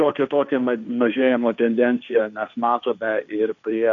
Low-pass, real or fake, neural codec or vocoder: 7.2 kHz; fake; codec, 16 kHz, 1.1 kbps, Voila-Tokenizer